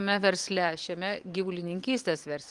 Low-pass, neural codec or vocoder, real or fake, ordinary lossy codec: 10.8 kHz; vocoder, 44.1 kHz, 128 mel bands every 512 samples, BigVGAN v2; fake; Opus, 24 kbps